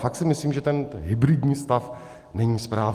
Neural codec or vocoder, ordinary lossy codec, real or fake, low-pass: none; Opus, 32 kbps; real; 14.4 kHz